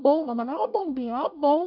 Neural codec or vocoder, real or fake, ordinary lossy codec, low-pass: codec, 24 kHz, 1 kbps, SNAC; fake; none; 5.4 kHz